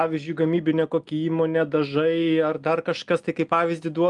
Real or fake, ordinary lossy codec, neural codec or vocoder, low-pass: real; Opus, 32 kbps; none; 10.8 kHz